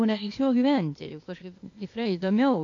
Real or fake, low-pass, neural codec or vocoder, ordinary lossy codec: fake; 7.2 kHz; codec, 16 kHz, 0.8 kbps, ZipCodec; AAC, 48 kbps